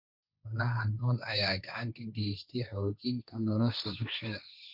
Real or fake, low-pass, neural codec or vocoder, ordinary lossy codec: fake; 5.4 kHz; codec, 16 kHz, 1.1 kbps, Voila-Tokenizer; none